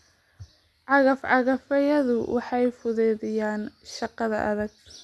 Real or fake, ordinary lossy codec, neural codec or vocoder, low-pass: real; none; none; none